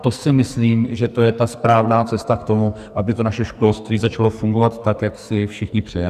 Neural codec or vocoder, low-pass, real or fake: codec, 44.1 kHz, 2.6 kbps, SNAC; 14.4 kHz; fake